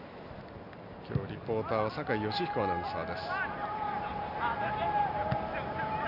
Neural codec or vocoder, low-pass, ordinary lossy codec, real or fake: none; 5.4 kHz; none; real